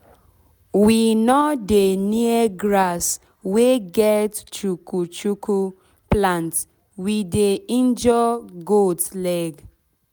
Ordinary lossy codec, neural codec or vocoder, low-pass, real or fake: none; none; none; real